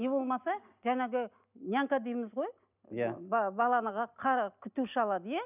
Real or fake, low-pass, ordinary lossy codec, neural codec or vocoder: real; 3.6 kHz; none; none